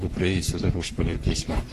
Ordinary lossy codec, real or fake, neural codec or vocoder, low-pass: AAC, 48 kbps; fake; codec, 44.1 kHz, 3.4 kbps, Pupu-Codec; 14.4 kHz